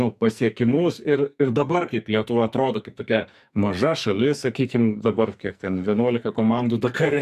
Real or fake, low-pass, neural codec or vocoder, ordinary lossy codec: fake; 14.4 kHz; codec, 44.1 kHz, 2.6 kbps, SNAC; MP3, 96 kbps